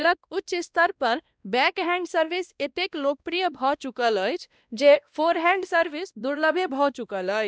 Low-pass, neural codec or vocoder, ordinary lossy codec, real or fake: none; codec, 16 kHz, 1 kbps, X-Codec, WavLM features, trained on Multilingual LibriSpeech; none; fake